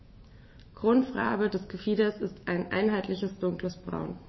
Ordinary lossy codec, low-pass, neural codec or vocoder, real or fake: MP3, 24 kbps; 7.2 kHz; autoencoder, 48 kHz, 128 numbers a frame, DAC-VAE, trained on Japanese speech; fake